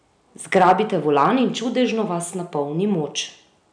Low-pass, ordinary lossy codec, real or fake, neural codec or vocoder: 9.9 kHz; none; real; none